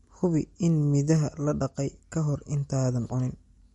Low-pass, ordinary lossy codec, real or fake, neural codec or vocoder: 10.8 kHz; MP3, 48 kbps; real; none